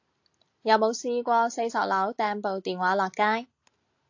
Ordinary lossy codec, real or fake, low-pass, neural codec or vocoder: AAC, 48 kbps; real; 7.2 kHz; none